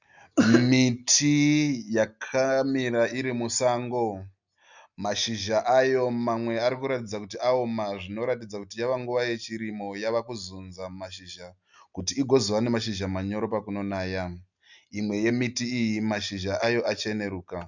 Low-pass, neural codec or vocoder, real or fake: 7.2 kHz; none; real